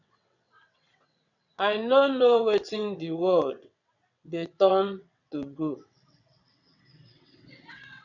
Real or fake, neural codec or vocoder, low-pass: fake; vocoder, 22.05 kHz, 80 mel bands, WaveNeXt; 7.2 kHz